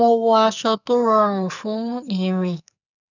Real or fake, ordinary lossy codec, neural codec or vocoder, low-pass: fake; none; codec, 44.1 kHz, 2.6 kbps, SNAC; 7.2 kHz